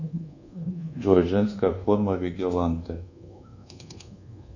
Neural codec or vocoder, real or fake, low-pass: codec, 24 kHz, 1.2 kbps, DualCodec; fake; 7.2 kHz